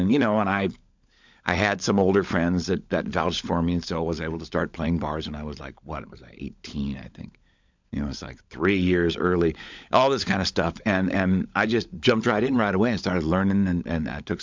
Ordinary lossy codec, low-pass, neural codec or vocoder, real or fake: MP3, 64 kbps; 7.2 kHz; codec, 16 kHz, 16 kbps, FunCodec, trained on LibriTTS, 50 frames a second; fake